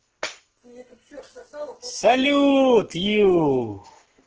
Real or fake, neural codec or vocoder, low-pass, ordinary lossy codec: fake; codec, 44.1 kHz, 2.6 kbps, SNAC; 7.2 kHz; Opus, 16 kbps